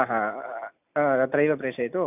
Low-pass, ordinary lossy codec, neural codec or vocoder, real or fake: 3.6 kHz; none; none; real